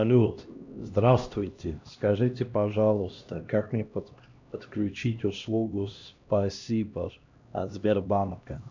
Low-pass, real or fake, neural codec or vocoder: 7.2 kHz; fake; codec, 16 kHz, 1 kbps, X-Codec, HuBERT features, trained on LibriSpeech